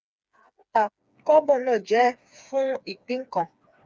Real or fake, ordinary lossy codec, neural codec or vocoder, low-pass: fake; none; codec, 16 kHz, 4 kbps, FreqCodec, smaller model; none